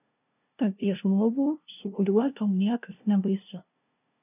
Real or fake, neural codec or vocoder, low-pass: fake; codec, 16 kHz, 0.5 kbps, FunCodec, trained on LibriTTS, 25 frames a second; 3.6 kHz